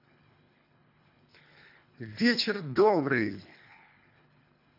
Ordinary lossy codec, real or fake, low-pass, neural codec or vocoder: AAC, 48 kbps; fake; 5.4 kHz; codec, 24 kHz, 3 kbps, HILCodec